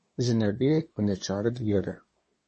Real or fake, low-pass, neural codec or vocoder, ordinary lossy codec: fake; 10.8 kHz; codec, 24 kHz, 1 kbps, SNAC; MP3, 32 kbps